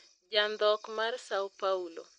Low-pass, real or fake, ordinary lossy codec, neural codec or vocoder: 9.9 kHz; real; MP3, 48 kbps; none